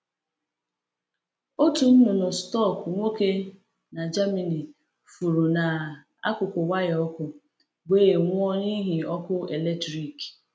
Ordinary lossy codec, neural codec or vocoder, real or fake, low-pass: none; none; real; none